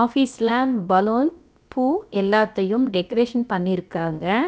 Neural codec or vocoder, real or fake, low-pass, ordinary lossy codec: codec, 16 kHz, about 1 kbps, DyCAST, with the encoder's durations; fake; none; none